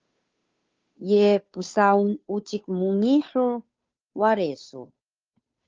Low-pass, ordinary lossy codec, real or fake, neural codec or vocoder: 7.2 kHz; Opus, 24 kbps; fake; codec, 16 kHz, 2 kbps, FunCodec, trained on Chinese and English, 25 frames a second